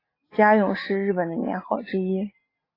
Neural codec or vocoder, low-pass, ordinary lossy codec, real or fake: none; 5.4 kHz; AAC, 24 kbps; real